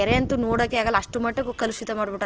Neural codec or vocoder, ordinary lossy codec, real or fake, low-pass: none; Opus, 16 kbps; real; 7.2 kHz